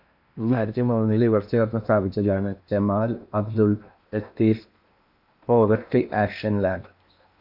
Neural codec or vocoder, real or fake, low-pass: codec, 16 kHz in and 24 kHz out, 0.8 kbps, FocalCodec, streaming, 65536 codes; fake; 5.4 kHz